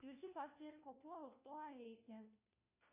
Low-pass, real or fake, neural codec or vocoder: 3.6 kHz; fake; codec, 16 kHz, 1 kbps, FunCodec, trained on LibriTTS, 50 frames a second